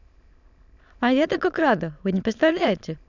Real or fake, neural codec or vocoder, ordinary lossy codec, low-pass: fake; autoencoder, 22.05 kHz, a latent of 192 numbers a frame, VITS, trained on many speakers; none; 7.2 kHz